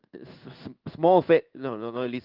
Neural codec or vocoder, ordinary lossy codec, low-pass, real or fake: codec, 16 kHz in and 24 kHz out, 1 kbps, XY-Tokenizer; Opus, 24 kbps; 5.4 kHz; fake